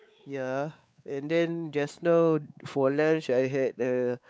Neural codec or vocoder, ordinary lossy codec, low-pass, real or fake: codec, 16 kHz, 4 kbps, X-Codec, WavLM features, trained on Multilingual LibriSpeech; none; none; fake